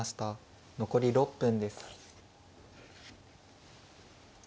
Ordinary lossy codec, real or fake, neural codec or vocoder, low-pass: none; real; none; none